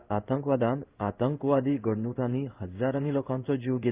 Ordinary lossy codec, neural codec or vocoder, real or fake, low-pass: Opus, 24 kbps; codec, 16 kHz in and 24 kHz out, 1 kbps, XY-Tokenizer; fake; 3.6 kHz